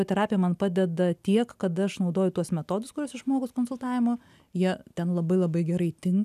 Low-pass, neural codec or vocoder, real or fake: 14.4 kHz; none; real